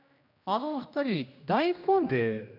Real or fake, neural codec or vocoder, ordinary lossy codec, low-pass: fake; codec, 16 kHz, 1 kbps, X-Codec, HuBERT features, trained on general audio; none; 5.4 kHz